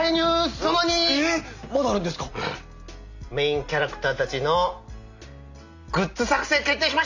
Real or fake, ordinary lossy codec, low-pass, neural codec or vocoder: real; none; 7.2 kHz; none